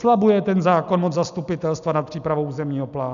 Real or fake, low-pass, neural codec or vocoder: real; 7.2 kHz; none